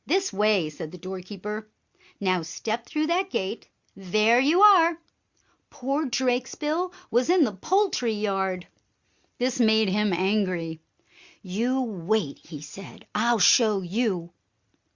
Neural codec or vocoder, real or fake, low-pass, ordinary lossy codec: none; real; 7.2 kHz; Opus, 64 kbps